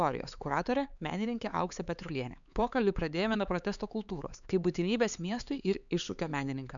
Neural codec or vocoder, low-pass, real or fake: codec, 16 kHz, 4 kbps, X-Codec, HuBERT features, trained on LibriSpeech; 7.2 kHz; fake